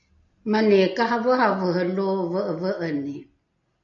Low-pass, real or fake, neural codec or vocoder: 7.2 kHz; real; none